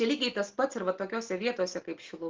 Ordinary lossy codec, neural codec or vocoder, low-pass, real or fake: Opus, 16 kbps; none; 7.2 kHz; real